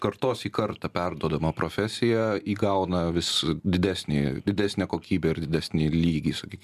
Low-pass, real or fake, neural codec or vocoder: 14.4 kHz; real; none